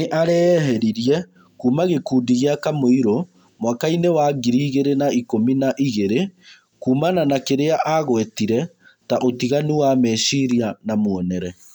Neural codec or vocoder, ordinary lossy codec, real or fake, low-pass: none; none; real; 19.8 kHz